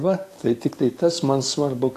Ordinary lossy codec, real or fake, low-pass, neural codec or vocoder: AAC, 48 kbps; fake; 14.4 kHz; codec, 44.1 kHz, 7.8 kbps, DAC